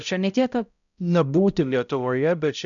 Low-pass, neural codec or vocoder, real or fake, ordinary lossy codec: 7.2 kHz; codec, 16 kHz, 0.5 kbps, X-Codec, HuBERT features, trained on balanced general audio; fake; MP3, 96 kbps